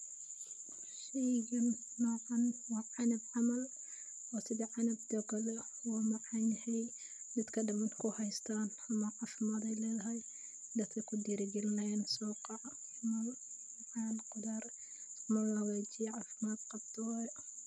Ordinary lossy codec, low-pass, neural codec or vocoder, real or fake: none; none; none; real